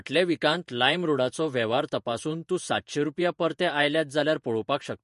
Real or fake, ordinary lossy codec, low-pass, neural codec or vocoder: fake; MP3, 48 kbps; 14.4 kHz; vocoder, 48 kHz, 128 mel bands, Vocos